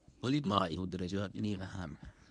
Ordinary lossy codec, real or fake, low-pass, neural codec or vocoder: none; fake; 10.8 kHz; codec, 24 kHz, 0.9 kbps, WavTokenizer, medium speech release version 1